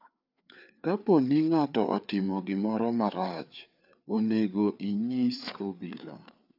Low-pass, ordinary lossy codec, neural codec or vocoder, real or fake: 5.4 kHz; none; codec, 16 kHz, 4 kbps, FreqCodec, larger model; fake